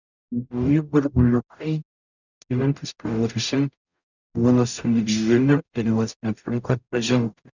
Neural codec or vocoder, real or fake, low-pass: codec, 44.1 kHz, 0.9 kbps, DAC; fake; 7.2 kHz